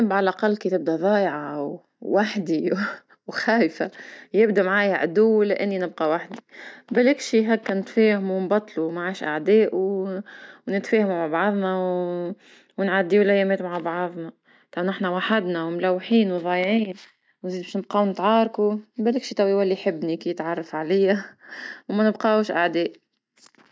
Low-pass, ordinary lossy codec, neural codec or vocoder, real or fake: none; none; none; real